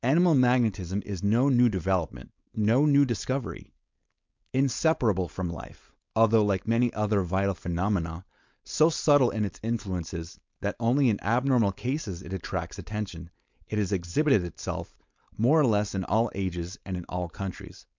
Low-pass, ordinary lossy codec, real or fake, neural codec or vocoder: 7.2 kHz; MP3, 64 kbps; fake; codec, 16 kHz, 4.8 kbps, FACodec